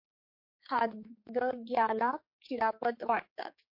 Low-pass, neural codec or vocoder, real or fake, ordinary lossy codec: 5.4 kHz; codec, 16 kHz, 4 kbps, X-Codec, HuBERT features, trained on general audio; fake; MP3, 32 kbps